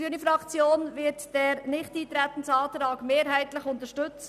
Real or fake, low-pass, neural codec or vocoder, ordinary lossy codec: real; 14.4 kHz; none; none